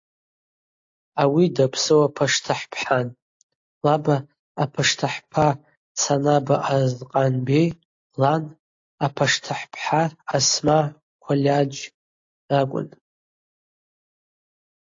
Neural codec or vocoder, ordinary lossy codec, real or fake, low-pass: none; AAC, 48 kbps; real; 7.2 kHz